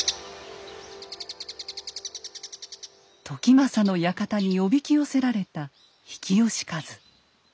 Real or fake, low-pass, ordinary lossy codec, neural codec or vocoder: real; none; none; none